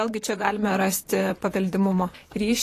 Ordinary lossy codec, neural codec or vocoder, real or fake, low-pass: AAC, 48 kbps; vocoder, 44.1 kHz, 128 mel bands, Pupu-Vocoder; fake; 14.4 kHz